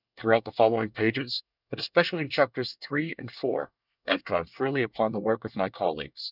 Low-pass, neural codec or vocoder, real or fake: 5.4 kHz; codec, 24 kHz, 1 kbps, SNAC; fake